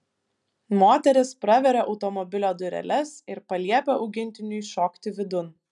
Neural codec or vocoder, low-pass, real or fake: none; 10.8 kHz; real